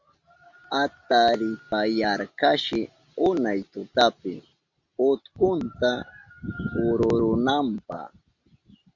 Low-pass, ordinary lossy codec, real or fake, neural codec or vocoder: 7.2 kHz; Opus, 64 kbps; real; none